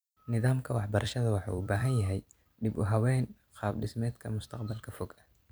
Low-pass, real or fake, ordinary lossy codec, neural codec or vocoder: none; fake; none; vocoder, 44.1 kHz, 128 mel bands every 256 samples, BigVGAN v2